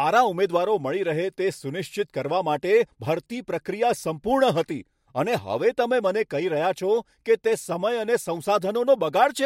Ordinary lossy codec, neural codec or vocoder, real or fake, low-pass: MP3, 64 kbps; none; real; 19.8 kHz